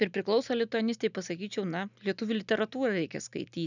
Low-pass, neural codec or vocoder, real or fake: 7.2 kHz; none; real